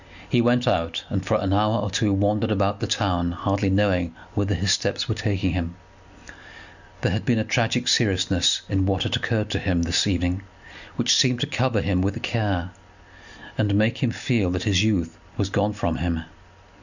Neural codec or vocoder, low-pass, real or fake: none; 7.2 kHz; real